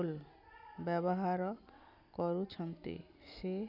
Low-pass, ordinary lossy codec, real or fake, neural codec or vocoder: 5.4 kHz; none; real; none